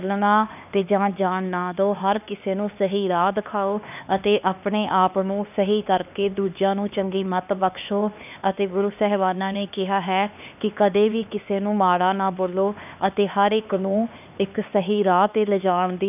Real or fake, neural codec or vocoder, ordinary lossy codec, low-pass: fake; codec, 16 kHz, 2 kbps, X-Codec, HuBERT features, trained on LibriSpeech; none; 3.6 kHz